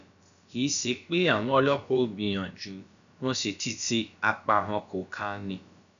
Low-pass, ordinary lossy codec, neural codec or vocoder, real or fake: 7.2 kHz; none; codec, 16 kHz, about 1 kbps, DyCAST, with the encoder's durations; fake